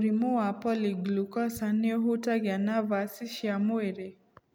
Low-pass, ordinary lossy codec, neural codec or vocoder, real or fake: none; none; none; real